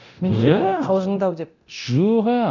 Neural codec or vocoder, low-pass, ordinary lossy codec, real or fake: codec, 24 kHz, 0.9 kbps, DualCodec; 7.2 kHz; none; fake